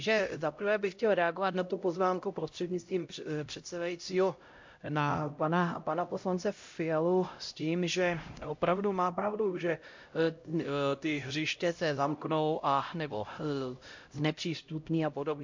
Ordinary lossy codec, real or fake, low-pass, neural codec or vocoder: MP3, 48 kbps; fake; 7.2 kHz; codec, 16 kHz, 0.5 kbps, X-Codec, HuBERT features, trained on LibriSpeech